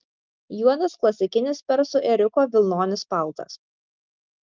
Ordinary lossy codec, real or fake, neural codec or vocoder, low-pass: Opus, 24 kbps; real; none; 7.2 kHz